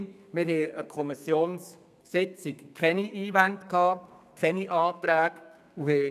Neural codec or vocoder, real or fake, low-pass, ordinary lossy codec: codec, 32 kHz, 1.9 kbps, SNAC; fake; 14.4 kHz; none